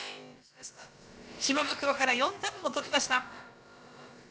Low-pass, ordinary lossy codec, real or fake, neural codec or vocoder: none; none; fake; codec, 16 kHz, about 1 kbps, DyCAST, with the encoder's durations